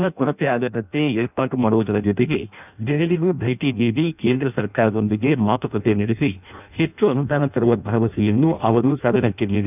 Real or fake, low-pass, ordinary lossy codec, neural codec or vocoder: fake; 3.6 kHz; none; codec, 16 kHz in and 24 kHz out, 0.6 kbps, FireRedTTS-2 codec